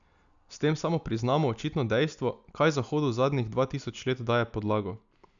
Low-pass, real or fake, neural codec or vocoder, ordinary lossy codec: 7.2 kHz; real; none; none